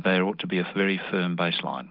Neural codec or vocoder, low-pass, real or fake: none; 5.4 kHz; real